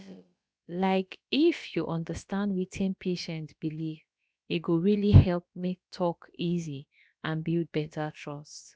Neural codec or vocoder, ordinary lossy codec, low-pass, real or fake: codec, 16 kHz, about 1 kbps, DyCAST, with the encoder's durations; none; none; fake